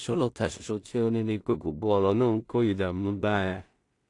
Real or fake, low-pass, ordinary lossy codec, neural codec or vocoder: fake; 10.8 kHz; AAC, 48 kbps; codec, 16 kHz in and 24 kHz out, 0.4 kbps, LongCat-Audio-Codec, two codebook decoder